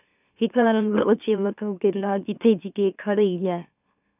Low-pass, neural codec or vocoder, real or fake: 3.6 kHz; autoencoder, 44.1 kHz, a latent of 192 numbers a frame, MeloTTS; fake